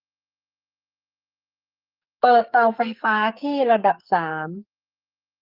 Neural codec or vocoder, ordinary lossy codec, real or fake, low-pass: codec, 44.1 kHz, 2.6 kbps, SNAC; Opus, 32 kbps; fake; 5.4 kHz